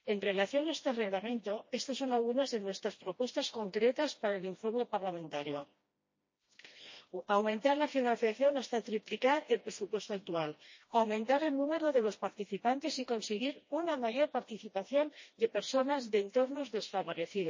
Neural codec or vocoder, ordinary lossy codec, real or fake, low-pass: codec, 16 kHz, 1 kbps, FreqCodec, smaller model; MP3, 32 kbps; fake; 7.2 kHz